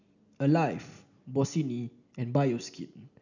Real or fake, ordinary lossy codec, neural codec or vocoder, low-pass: real; none; none; 7.2 kHz